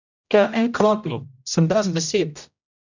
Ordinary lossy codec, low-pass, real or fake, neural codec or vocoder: none; 7.2 kHz; fake; codec, 16 kHz, 0.5 kbps, X-Codec, HuBERT features, trained on general audio